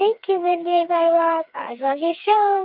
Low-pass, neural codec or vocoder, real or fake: 5.4 kHz; codec, 16 kHz, 4 kbps, FreqCodec, smaller model; fake